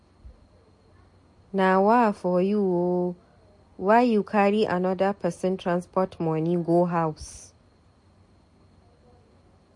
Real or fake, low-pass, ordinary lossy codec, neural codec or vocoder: real; 10.8 kHz; MP3, 48 kbps; none